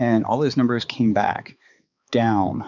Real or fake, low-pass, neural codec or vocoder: fake; 7.2 kHz; codec, 16 kHz, 4 kbps, X-Codec, HuBERT features, trained on balanced general audio